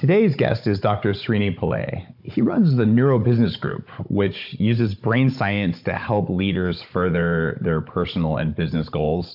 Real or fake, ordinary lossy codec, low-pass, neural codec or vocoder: fake; MP3, 48 kbps; 5.4 kHz; codec, 16 kHz, 16 kbps, FunCodec, trained on Chinese and English, 50 frames a second